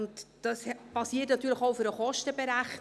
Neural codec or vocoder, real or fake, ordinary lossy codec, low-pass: none; real; none; none